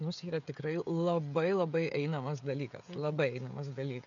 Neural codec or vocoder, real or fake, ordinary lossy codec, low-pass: codec, 16 kHz, 16 kbps, FreqCodec, smaller model; fake; MP3, 96 kbps; 7.2 kHz